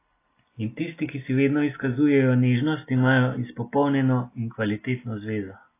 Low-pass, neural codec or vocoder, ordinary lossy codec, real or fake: 3.6 kHz; none; AAC, 24 kbps; real